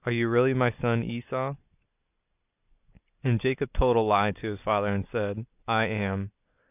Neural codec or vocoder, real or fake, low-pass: none; real; 3.6 kHz